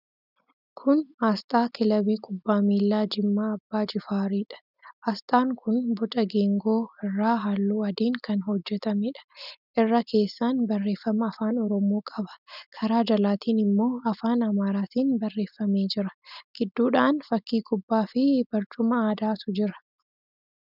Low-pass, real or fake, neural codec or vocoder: 5.4 kHz; real; none